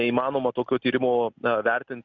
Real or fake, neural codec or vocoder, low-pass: real; none; 7.2 kHz